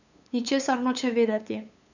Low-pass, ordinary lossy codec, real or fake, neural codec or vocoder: 7.2 kHz; none; fake; codec, 16 kHz, 8 kbps, FunCodec, trained on LibriTTS, 25 frames a second